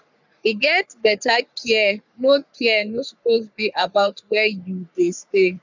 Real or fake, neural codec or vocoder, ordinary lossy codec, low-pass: fake; codec, 44.1 kHz, 3.4 kbps, Pupu-Codec; none; 7.2 kHz